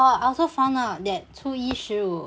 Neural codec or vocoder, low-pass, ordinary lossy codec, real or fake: none; none; none; real